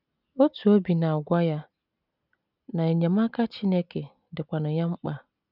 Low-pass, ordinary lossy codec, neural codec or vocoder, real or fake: 5.4 kHz; none; none; real